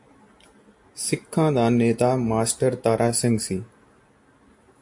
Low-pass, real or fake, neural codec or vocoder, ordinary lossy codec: 10.8 kHz; real; none; AAC, 64 kbps